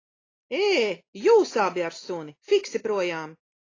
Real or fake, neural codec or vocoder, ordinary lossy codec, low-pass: real; none; AAC, 32 kbps; 7.2 kHz